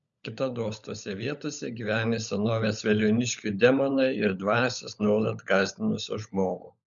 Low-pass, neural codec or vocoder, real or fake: 7.2 kHz; codec, 16 kHz, 16 kbps, FunCodec, trained on LibriTTS, 50 frames a second; fake